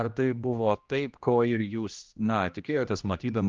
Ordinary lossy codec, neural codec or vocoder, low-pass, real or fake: Opus, 24 kbps; codec, 16 kHz, 1 kbps, X-Codec, HuBERT features, trained on general audio; 7.2 kHz; fake